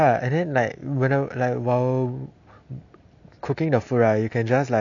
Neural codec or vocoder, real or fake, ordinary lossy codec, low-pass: none; real; none; 7.2 kHz